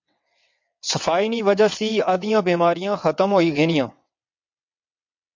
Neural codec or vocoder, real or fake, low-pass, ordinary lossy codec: vocoder, 22.05 kHz, 80 mel bands, WaveNeXt; fake; 7.2 kHz; MP3, 48 kbps